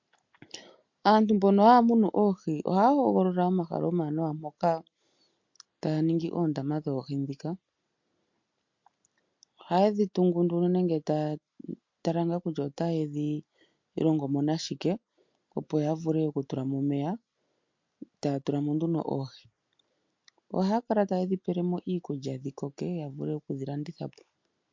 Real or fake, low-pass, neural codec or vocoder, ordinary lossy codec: real; 7.2 kHz; none; MP3, 48 kbps